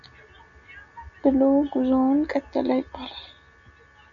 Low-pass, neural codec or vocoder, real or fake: 7.2 kHz; none; real